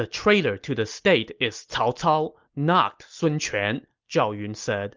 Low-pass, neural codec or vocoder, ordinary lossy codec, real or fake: 7.2 kHz; none; Opus, 24 kbps; real